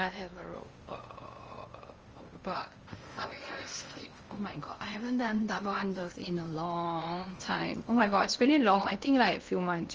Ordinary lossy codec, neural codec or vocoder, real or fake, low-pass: Opus, 24 kbps; codec, 16 kHz in and 24 kHz out, 0.8 kbps, FocalCodec, streaming, 65536 codes; fake; 7.2 kHz